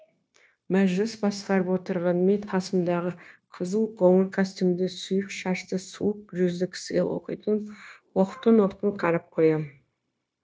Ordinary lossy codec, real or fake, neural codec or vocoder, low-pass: none; fake; codec, 16 kHz, 0.9 kbps, LongCat-Audio-Codec; none